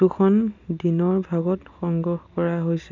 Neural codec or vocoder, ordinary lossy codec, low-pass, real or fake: none; none; 7.2 kHz; real